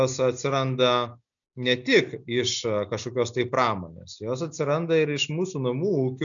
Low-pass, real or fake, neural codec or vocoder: 7.2 kHz; real; none